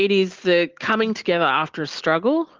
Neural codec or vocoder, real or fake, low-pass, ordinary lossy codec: none; real; 7.2 kHz; Opus, 16 kbps